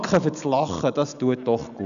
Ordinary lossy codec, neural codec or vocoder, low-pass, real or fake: none; none; 7.2 kHz; real